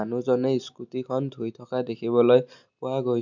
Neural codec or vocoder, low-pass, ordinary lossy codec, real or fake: none; 7.2 kHz; none; real